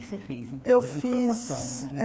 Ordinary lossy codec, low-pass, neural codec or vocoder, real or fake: none; none; codec, 16 kHz, 2 kbps, FreqCodec, larger model; fake